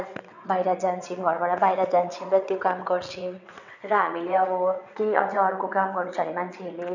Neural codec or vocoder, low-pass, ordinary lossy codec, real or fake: vocoder, 22.05 kHz, 80 mel bands, WaveNeXt; 7.2 kHz; none; fake